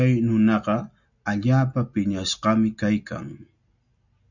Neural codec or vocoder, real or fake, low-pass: none; real; 7.2 kHz